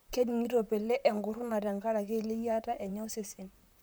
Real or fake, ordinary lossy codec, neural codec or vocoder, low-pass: fake; none; vocoder, 44.1 kHz, 128 mel bands, Pupu-Vocoder; none